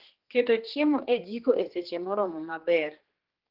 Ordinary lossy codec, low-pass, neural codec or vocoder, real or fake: Opus, 16 kbps; 5.4 kHz; codec, 16 kHz, 2 kbps, X-Codec, HuBERT features, trained on general audio; fake